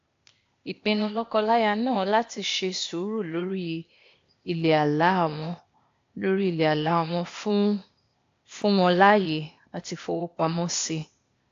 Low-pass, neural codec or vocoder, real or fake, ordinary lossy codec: 7.2 kHz; codec, 16 kHz, 0.8 kbps, ZipCodec; fake; AAC, 48 kbps